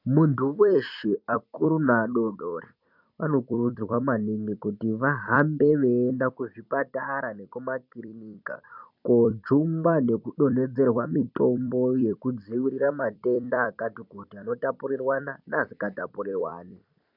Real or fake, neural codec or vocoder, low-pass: fake; vocoder, 44.1 kHz, 128 mel bands every 256 samples, BigVGAN v2; 5.4 kHz